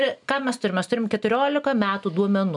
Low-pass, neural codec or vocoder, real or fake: 10.8 kHz; none; real